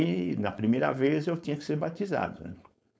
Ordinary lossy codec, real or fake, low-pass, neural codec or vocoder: none; fake; none; codec, 16 kHz, 4.8 kbps, FACodec